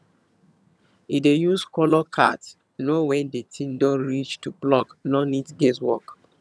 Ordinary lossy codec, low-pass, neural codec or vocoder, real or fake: none; none; vocoder, 22.05 kHz, 80 mel bands, HiFi-GAN; fake